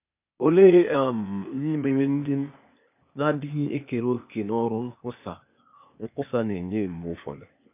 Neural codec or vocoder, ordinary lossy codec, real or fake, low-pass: codec, 16 kHz, 0.8 kbps, ZipCodec; none; fake; 3.6 kHz